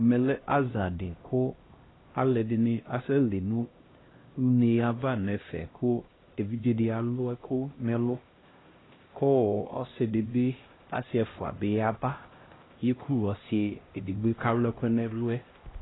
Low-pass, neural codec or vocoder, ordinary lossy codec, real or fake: 7.2 kHz; codec, 16 kHz, 1 kbps, X-Codec, HuBERT features, trained on LibriSpeech; AAC, 16 kbps; fake